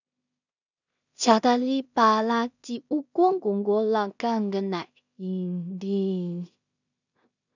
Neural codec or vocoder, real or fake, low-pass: codec, 16 kHz in and 24 kHz out, 0.4 kbps, LongCat-Audio-Codec, two codebook decoder; fake; 7.2 kHz